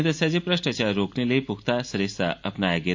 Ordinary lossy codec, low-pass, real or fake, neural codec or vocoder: MP3, 48 kbps; 7.2 kHz; real; none